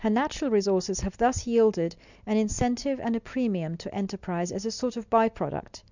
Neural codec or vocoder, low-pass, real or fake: none; 7.2 kHz; real